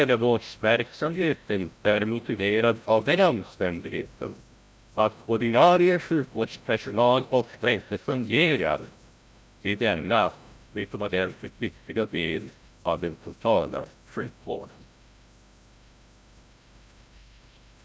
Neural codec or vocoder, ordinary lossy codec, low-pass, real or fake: codec, 16 kHz, 0.5 kbps, FreqCodec, larger model; none; none; fake